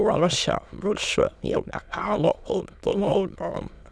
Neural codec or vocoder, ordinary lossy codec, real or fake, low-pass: autoencoder, 22.05 kHz, a latent of 192 numbers a frame, VITS, trained on many speakers; none; fake; none